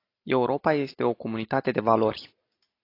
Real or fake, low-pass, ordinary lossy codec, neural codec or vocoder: real; 5.4 kHz; AAC, 32 kbps; none